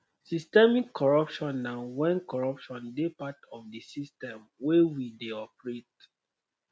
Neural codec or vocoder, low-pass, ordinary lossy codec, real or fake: none; none; none; real